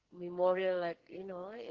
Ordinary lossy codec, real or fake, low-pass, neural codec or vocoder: Opus, 16 kbps; fake; 7.2 kHz; codec, 44.1 kHz, 2.6 kbps, SNAC